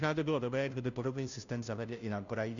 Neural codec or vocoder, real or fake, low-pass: codec, 16 kHz, 0.5 kbps, FunCodec, trained on Chinese and English, 25 frames a second; fake; 7.2 kHz